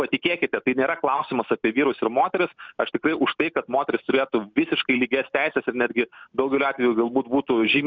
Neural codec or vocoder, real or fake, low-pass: none; real; 7.2 kHz